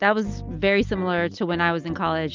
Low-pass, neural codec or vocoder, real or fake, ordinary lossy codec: 7.2 kHz; none; real; Opus, 24 kbps